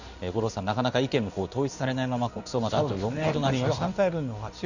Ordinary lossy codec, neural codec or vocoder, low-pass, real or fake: none; codec, 16 kHz in and 24 kHz out, 1 kbps, XY-Tokenizer; 7.2 kHz; fake